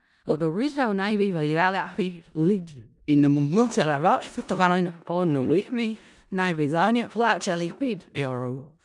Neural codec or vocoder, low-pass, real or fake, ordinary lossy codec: codec, 16 kHz in and 24 kHz out, 0.4 kbps, LongCat-Audio-Codec, four codebook decoder; 10.8 kHz; fake; none